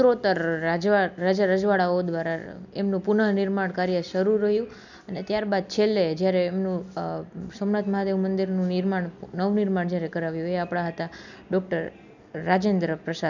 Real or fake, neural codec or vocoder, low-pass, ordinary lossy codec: real; none; 7.2 kHz; none